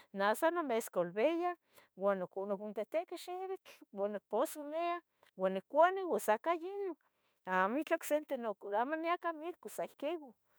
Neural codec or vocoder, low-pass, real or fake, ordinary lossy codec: autoencoder, 48 kHz, 32 numbers a frame, DAC-VAE, trained on Japanese speech; none; fake; none